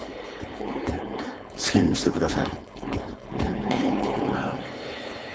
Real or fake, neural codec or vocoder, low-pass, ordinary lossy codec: fake; codec, 16 kHz, 4.8 kbps, FACodec; none; none